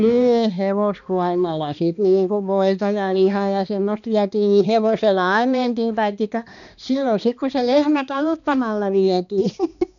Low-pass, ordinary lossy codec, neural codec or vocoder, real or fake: 7.2 kHz; MP3, 96 kbps; codec, 16 kHz, 2 kbps, X-Codec, HuBERT features, trained on balanced general audio; fake